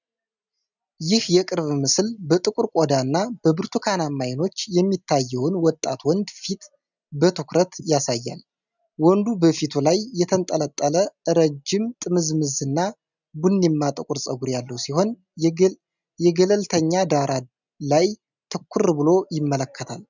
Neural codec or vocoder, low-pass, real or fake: none; 7.2 kHz; real